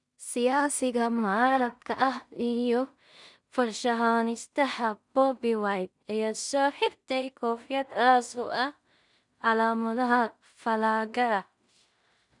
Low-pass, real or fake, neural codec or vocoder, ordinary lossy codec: 10.8 kHz; fake; codec, 16 kHz in and 24 kHz out, 0.4 kbps, LongCat-Audio-Codec, two codebook decoder; none